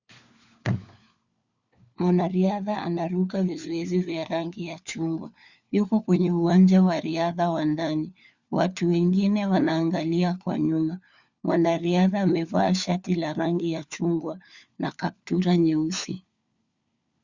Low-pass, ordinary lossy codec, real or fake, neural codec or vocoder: 7.2 kHz; Opus, 64 kbps; fake; codec, 16 kHz, 4 kbps, FunCodec, trained on LibriTTS, 50 frames a second